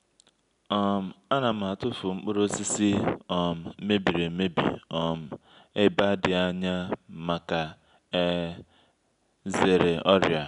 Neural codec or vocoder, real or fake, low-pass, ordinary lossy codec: none; real; 10.8 kHz; none